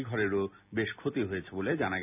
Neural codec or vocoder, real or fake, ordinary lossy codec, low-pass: none; real; none; 3.6 kHz